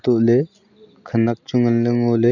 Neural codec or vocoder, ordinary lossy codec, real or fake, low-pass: none; none; real; 7.2 kHz